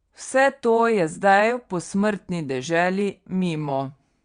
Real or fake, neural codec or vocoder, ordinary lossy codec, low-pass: fake; vocoder, 22.05 kHz, 80 mel bands, WaveNeXt; Opus, 64 kbps; 9.9 kHz